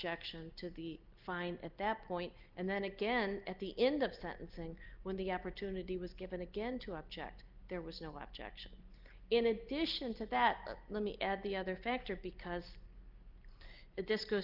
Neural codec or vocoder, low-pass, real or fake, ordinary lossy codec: none; 5.4 kHz; real; Opus, 24 kbps